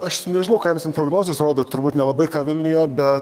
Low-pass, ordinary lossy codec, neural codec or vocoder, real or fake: 14.4 kHz; Opus, 32 kbps; codec, 32 kHz, 1.9 kbps, SNAC; fake